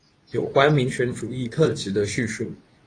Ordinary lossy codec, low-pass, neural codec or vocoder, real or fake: AAC, 32 kbps; 9.9 kHz; codec, 24 kHz, 0.9 kbps, WavTokenizer, medium speech release version 2; fake